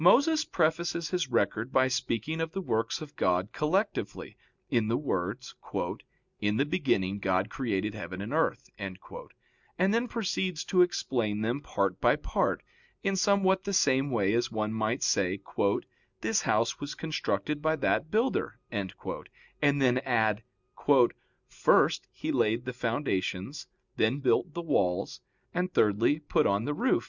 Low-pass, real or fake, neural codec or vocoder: 7.2 kHz; real; none